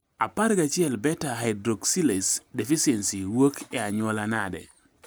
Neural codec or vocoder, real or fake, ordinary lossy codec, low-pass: none; real; none; none